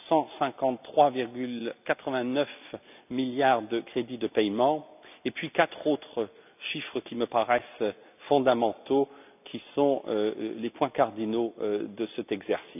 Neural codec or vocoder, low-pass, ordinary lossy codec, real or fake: none; 3.6 kHz; none; real